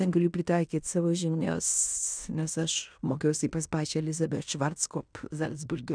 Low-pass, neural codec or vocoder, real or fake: 9.9 kHz; codec, 16 kHz in and 24 kHz out, 0.9 kbps, LongCat-Audio-Codec, fine tuned four codebook decoder; fake